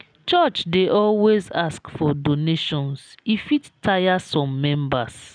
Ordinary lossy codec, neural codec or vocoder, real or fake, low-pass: none; none; real; 9.9 kHz